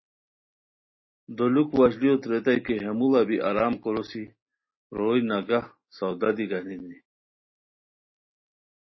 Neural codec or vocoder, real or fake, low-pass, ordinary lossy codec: none; real; 7.2 kHz; MP3, 24 kbps